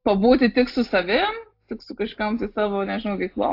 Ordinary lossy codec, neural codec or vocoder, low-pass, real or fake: AAC, 32 kbps; none; 5.4 kHz; real